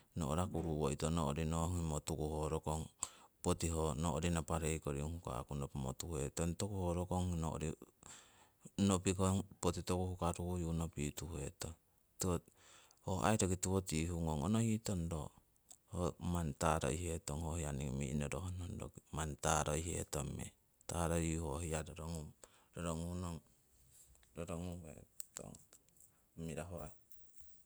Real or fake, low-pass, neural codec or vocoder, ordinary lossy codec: real; none; none; none